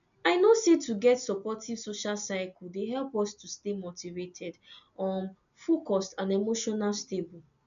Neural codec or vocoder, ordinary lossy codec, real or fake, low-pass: none; none; real; 7.2 kHz